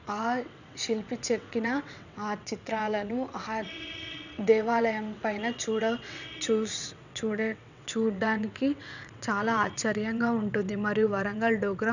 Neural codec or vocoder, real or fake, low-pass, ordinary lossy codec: vocoder, 22.05 kHz, 80 mel bands, WaveNeXt; fake; 7.2 kHz; none